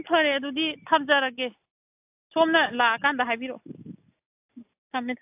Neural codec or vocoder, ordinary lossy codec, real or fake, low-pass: none; AAC, 32 kbps; real; 3.6 kHz